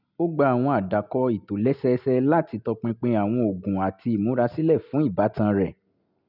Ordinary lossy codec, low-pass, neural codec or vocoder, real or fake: none; 5.4 kHz; none; real